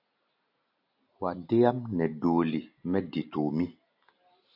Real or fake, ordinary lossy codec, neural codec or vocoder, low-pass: real; AAC, 48 kbps; none; 5.4 kHz